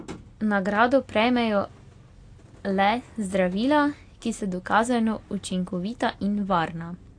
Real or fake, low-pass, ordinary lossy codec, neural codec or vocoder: real; 9.9 kHz; AAC, 48 kbps; none